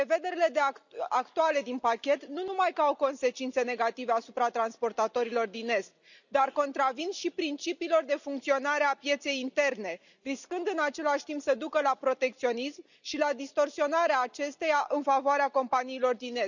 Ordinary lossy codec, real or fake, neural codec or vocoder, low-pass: none; real; none; 7.2 kHz